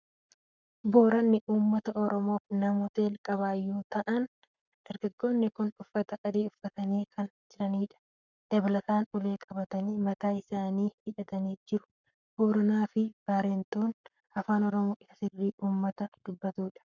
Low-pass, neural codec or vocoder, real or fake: 7.2 kHz; codec, 44.1 kHz, 7.8 kbps, Pupu-Codec; fake